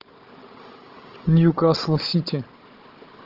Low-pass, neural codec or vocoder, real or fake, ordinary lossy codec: 5.4 kHz; codec, 16 kHz, 16 kbps, FunCodec, trained on Chinese and English, 50 frames a second; fake; Opus, 16 kbps